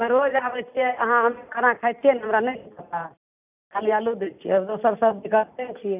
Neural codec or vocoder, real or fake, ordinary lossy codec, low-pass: none; real; none; 3.6 kHz